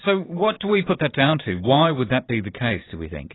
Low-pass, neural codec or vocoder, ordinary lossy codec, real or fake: 7.2 kHz; vocoder, 44.1 kHz, 128 mel bands every 512 samples, BigVGAN v2; AAC, 16 kbps; fake